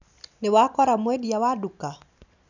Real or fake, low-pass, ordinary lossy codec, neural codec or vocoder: real; 7.2 kHz; none; none